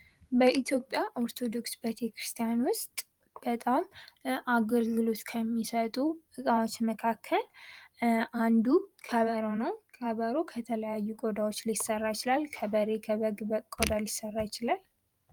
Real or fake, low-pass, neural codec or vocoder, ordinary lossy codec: fake; 19.8 kHz; vocoder, 44.1 kHz, 128 mel bands every 512 samples, BigVGAN v2; Opus, 32 kbps